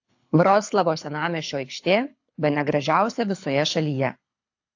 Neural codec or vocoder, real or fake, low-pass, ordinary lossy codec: codec, 24 kHz, 6 kbps, HILCodec; fake; 7.2 kHz; AAC, 48 kbps